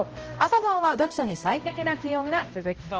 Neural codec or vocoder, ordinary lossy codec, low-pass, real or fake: codec, 16 kHz, 0.5 kbps, X-Codec, HuBERT features, trained on balanced general audio; Opus, 16 kbps; 7.2 kHz; fake